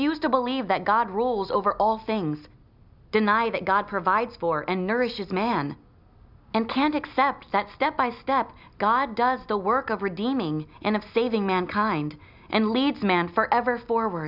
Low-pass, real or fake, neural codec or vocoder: 5.4 kHz; real; none